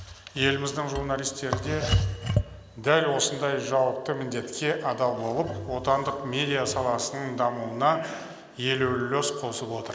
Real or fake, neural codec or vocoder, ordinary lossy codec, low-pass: real; none; none; none